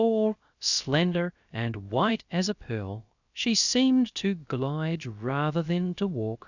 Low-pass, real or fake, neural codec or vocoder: 7.2 kHz; fake; codec, 16 kHz, 0.3 kbps, FocalCodec